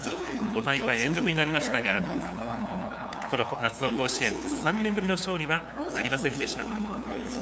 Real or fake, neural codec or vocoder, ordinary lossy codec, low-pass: fake; codec, 16 kHz, 2 kbps, FunCodec, trained on LibriTTS, 25 frames a second; none; none